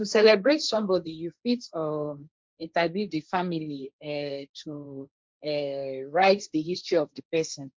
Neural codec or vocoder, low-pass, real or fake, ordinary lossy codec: codec, 16 kHz, 1.1 kbps, Voila-Tokenizer; none; fake; none